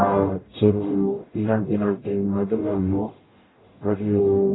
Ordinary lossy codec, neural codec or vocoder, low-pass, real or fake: AAC, 16 kbps; codec, 44.1 kHz, 0.9 kbps, DAC; 7.2 kHz; fake